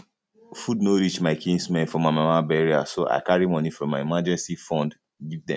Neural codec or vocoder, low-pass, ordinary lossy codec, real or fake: none; none; none; real